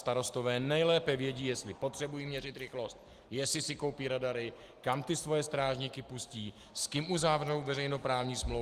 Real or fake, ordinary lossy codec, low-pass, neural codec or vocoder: real; Opus, 24 kbps; 14.4 kHz; none